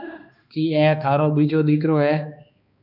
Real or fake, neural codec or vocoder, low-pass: fake; codec, 16 kHz, 2 kbps, X-Codec, HuBERT features, trained on balanced general audio; 5.4 kHz